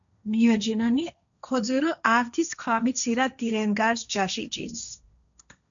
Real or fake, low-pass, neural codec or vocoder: fake; 7.2 kHz; codec, 16 kHz, 1.1 kbps, Voila-Tokenizer